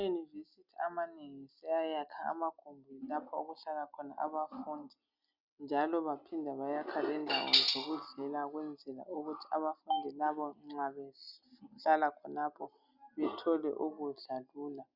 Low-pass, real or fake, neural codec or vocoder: 5.4 kHz; real; none